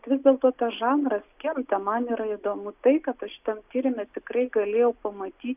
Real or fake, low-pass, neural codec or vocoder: real; 3.6 kHz; none